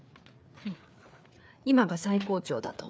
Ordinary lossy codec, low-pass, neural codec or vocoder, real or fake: none; none; codec, 16 kHz, 4 kbps, FreqCodec, larger model; fake